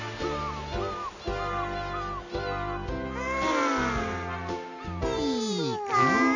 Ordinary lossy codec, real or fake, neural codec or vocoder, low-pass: none; real; none; 7.2 kHz